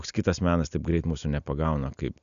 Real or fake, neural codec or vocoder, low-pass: real; none; 7.2 kHz